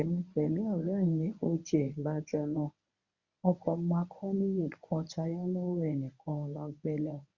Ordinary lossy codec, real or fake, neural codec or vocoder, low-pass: Opus, 64 kbps; fake; codec, 24 kHz, 0.9 kbps, WavTokenizer, medium speech release version 1; 7.2 kHz